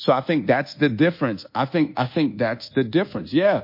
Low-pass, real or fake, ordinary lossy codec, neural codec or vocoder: 5.4 kHz; fake; MP3, 32 kbps; codec, 24 kHz, 1.2 kbps, DualCodec